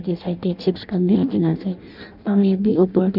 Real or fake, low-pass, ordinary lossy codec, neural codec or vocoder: fake; 5.4 kHz; none; codec, 16 kHz in and 24 kHz out, 0.6 kbps, FireRedTTS-2 codec